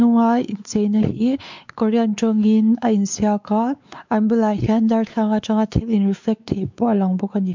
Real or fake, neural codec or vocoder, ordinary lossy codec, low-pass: fake; codec, 16 kHz, 4 kbps, X-Codec, WavLM features, trained on Multilingual LibriSpeech; MP3, 64 kbps; 7.2 kHz